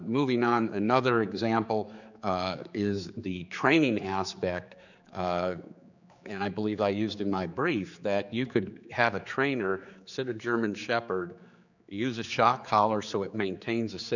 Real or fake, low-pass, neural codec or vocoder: fake; 7.2 kHz; codec, 16 kHz, 4 kbps, X-Codec, HuBERT features, trained on general audio